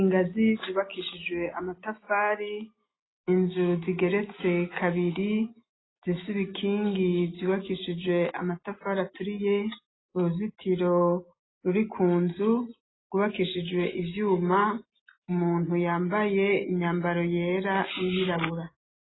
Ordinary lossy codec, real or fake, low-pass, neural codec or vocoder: AAC, 16 kbps; real; 7.2 kHz; none